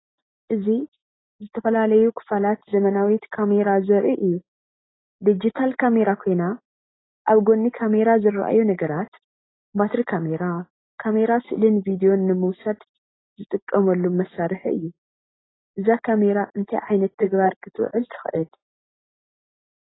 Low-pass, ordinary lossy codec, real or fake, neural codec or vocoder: 7.2 kHz; AAC, 16 kbps; real; none